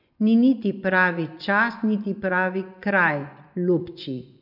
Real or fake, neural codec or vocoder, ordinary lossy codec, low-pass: fake; vocoder, 24 kHz, 100 mel bands, Vocos; none; 5.4 kHz